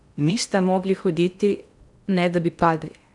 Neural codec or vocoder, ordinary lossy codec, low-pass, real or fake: codec, 16 kHz in and 24 kHz out, 0.6 kbps, FocalCodec, streaming, 4096 codes; none; 10.8 kHz; fake